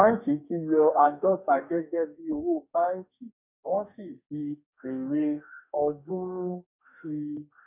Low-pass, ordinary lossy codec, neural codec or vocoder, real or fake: 3.6 kHz; none; codec, 44.1 kHz, 2.6 kbps, DAC; fake